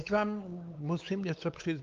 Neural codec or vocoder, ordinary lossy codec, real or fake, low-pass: codec, 16 kHz, 4 kbps, X-Codec, WavLM features, trained on Multilingual LibriSpeech; Opus, 32 kbps; fake; 7.2 kHz